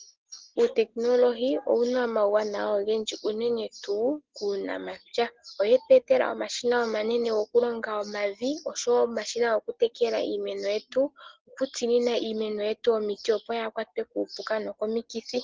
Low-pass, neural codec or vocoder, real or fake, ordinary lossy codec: 7.2 kHz; none; real; Opus, 16 kbps